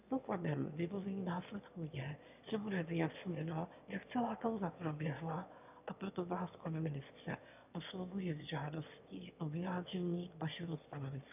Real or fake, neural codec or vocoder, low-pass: fake; autoencoder, 22.05 kHz, a latent of 192 numbers a frame, VITS, trained on one speaker; 3.6 kHz